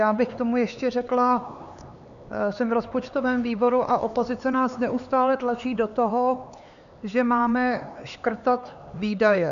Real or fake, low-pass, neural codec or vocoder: fake; 7.2 kHz; codec, 16 kHz, 4 kbps, X-Codec, HuBERT features, trained on LibriSpeech